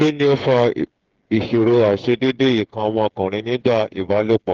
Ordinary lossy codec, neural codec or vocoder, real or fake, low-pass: Opus, 16 kbps; codec, 16 kHz, 8 kbps, FreqCodec, smaller model; fake; 7.2 kHz